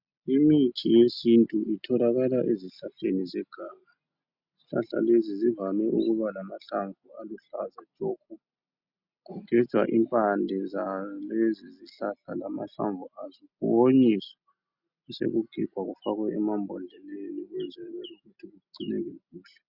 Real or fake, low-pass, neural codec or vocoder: real; 5.4 kHz; none